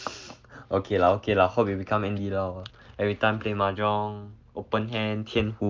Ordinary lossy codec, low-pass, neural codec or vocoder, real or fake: Opus, 24 kbps; 7.2 kHz; none; real